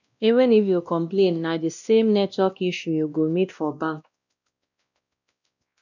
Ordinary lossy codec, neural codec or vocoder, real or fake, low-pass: none; codec, 16 kHz, 1 kbps, X-Codec, WavLM features, trained on Multilingual LibriSpeech; fake; 7.2 kHz